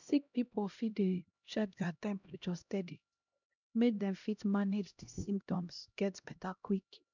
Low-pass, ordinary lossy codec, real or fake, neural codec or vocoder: 7.2 kHz; none; fake; codec, 16 kHz, 1 kbps, X-Codec, HuBERT features, trained on LibriSpeech